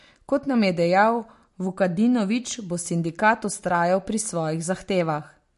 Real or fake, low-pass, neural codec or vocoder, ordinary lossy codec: real; 14.4 kHz; none; MP3, 48 kbps